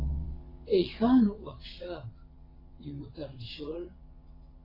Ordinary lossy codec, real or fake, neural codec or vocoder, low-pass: AAC, 24 kbps; fake; vocoder, 22.05 kHz, 80 mel bands, WaveNeXt; 5.4 kHz